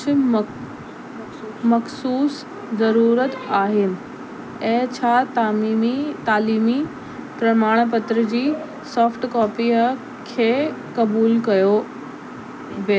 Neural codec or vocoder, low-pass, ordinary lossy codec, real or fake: none; none; none; real